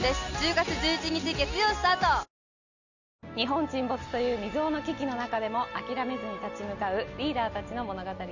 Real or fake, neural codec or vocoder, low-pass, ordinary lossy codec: real; none; 7.2 kHz; none